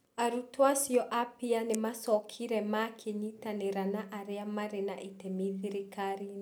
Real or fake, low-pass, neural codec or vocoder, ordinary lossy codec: real; none; none; none